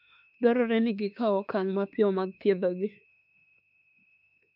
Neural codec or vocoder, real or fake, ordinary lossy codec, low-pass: autoencoder, 48 kHz, 32 numbers a frame, DAC-VAE, trained on Japanese speech; fake; none; 5.4 kHz